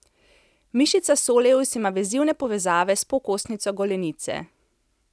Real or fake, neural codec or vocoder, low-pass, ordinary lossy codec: real; none; none; none